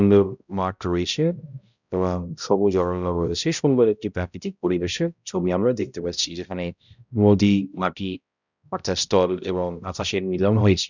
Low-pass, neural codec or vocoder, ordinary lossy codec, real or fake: 7.2 kHz; codec, 16 kHz, 0.5 kbps, X-Codec, HuBERT features, trained on balanced general audio; none; fake